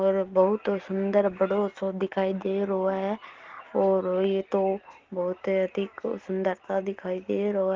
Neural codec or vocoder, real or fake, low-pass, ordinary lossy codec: none; real; 7.2 kHz; Opus, 16 kbps